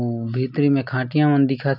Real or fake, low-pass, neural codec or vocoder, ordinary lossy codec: real; 5.4 kHz; none; none